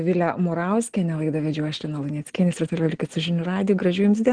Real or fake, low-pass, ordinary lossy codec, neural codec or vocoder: real; 9.9 kHz; Opus, 16 kbps; none